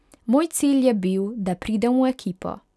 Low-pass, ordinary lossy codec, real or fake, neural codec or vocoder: none; none; real; none